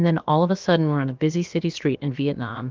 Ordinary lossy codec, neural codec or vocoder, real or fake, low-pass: Opus, 32 kbps; codec, 16 kHz, about 1 kbps, DyCAST, with the encoder's durations; fake; 7.2 kHz